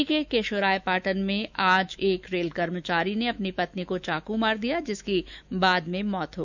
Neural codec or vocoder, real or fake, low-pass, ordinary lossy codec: autoencoder, 48 kHz, 128 numbers a frame, DAC-VAE, trained on Japanese speech; fake; 7.2 kHz; none